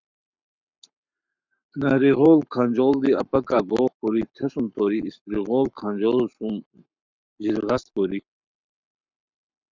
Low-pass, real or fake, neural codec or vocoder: 7.2 kHz; fake; codec, 44.1 kHz, 7.8 kbps, Pupu-Codec